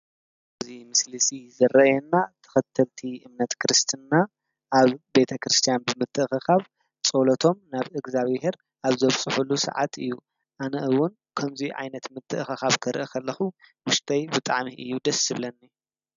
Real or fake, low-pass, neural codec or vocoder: real; 7.2 kHz; none